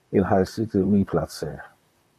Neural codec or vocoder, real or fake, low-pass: vocoder, 44.1 kHz, 128 mel bands, Pupu-Vocoder; fake; 14.4 kHz